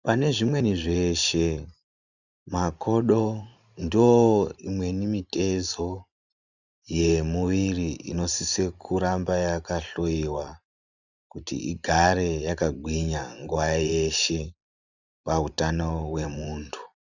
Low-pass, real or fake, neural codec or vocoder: 7.2 kHz; fake; vocoder, 44.1 kHz, 128 mel bands every 512 samples, BigVGAN v2